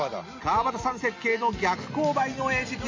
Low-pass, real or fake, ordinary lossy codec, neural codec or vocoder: 7.2 kHz; real; MP3, 48 kbps; none